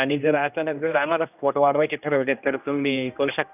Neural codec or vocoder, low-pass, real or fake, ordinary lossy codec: codec, 16 kHz, 1 kbps, X-Codec, HuBERT features, trained on general audio; 3.6 kHz; fake; none